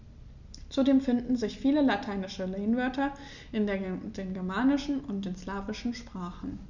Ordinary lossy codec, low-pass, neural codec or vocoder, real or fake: none; 7.2 kHz; none; real